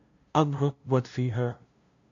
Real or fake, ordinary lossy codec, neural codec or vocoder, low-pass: fake; MP3, 48 kbps; codec, 16 kHz, 0.5 kbps, FunCodec, trained on LibriTTS, 25 frames a second; 7.2 kHz